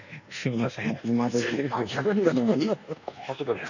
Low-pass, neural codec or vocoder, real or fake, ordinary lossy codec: 7.2 kHz; codec, 24 kHz, 1.2 kbps, DualCodec; fake; none